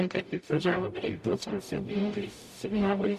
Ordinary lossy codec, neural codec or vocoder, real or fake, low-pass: MP3, 64 kbps; codec, 44.1 kHz, 0.9 kbps, DAC; fake; 14.4 kHz